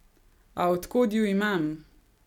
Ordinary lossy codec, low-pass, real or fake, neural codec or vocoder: none; 19.8 kHz; real; none